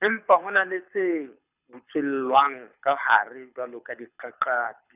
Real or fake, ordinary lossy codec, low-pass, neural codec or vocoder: fake; none; 3.6 kHz; codec, 24 kHz, 6 kbps, HILCodec